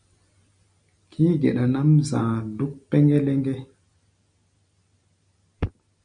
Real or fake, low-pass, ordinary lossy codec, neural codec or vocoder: real; 9.9 kHz; MP3, 64 kbps; none